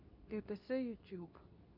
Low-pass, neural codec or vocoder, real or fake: 5.4 kHz; codec, 24 kHz, 0.9 kbps, WavTokenizer, medium speech release version 2; fake